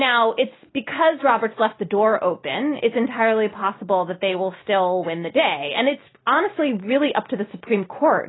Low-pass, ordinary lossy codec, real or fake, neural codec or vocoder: 7.2 kHz; AAC, 16 kbps; real; none